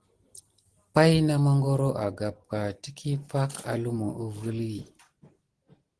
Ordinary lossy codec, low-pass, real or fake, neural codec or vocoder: Opus, 16 kbps; 10.8 kHz; real; none